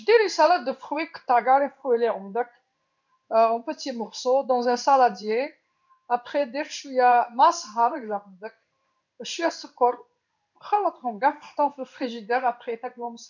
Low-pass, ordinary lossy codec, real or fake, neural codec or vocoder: 7.2 kHz; none; fake; codec, 16 kHz in and 24 kHz out, 1 kbps, XY-Tokenizer